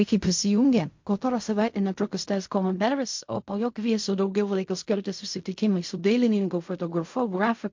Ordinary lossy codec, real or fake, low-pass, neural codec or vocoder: MP3, 48 kbps; fake; 7.2 kHz; codec, 16 kHz in and 24 kHz out, 0.4 kbps, LongCat-Audio-Codec, fine tuned four codebook decoder